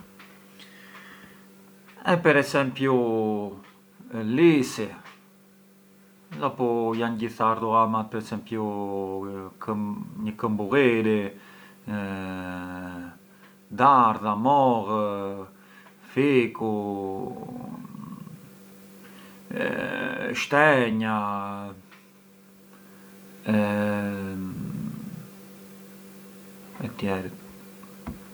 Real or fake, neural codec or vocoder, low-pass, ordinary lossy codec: real; none; none; none